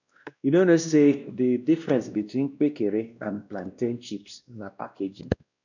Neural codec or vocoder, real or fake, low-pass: codec, 16 kHz, 1 kbps, X-Codec, WavLM features, trained on Multilingual LibriSpeech; fake; 7.2 kHz